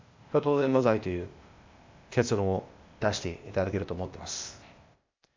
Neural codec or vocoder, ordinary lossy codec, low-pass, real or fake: codec, 16 kHz, 0.8 kbps, ZipCodec; MP3, 64 kbps; 7.2 kHz; fake